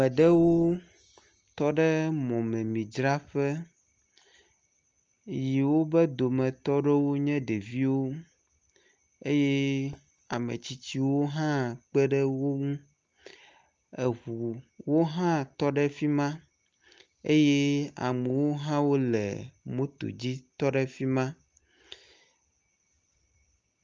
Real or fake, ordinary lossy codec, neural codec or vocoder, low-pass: real; Opus, 24 kbps; none; 7.2 kHz